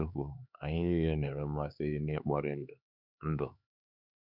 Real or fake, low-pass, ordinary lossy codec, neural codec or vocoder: fake; 5.4 kHz; none; codec, 16 kHz, 4 kbps, X-Codec, HuBERT features, trained on LibriSpeech